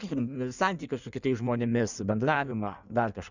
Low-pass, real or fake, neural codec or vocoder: 7.2 kHz; fake; codec, 16 kHz in and 24 kHz out, 1.1 kbps, FireRedTTS-2 codec